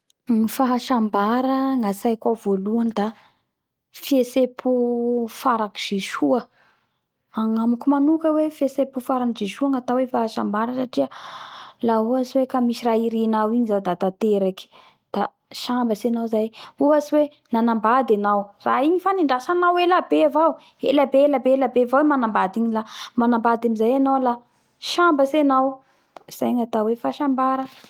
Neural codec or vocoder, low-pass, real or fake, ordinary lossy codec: none; 19.8 kHz; real; Opus, 24 kbps